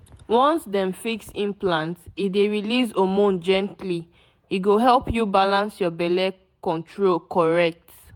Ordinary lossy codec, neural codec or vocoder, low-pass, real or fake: MP3, 96 kbps; vocoder, 48 kHz, 128 mel bands, Vocos; 19.8 kHz; fake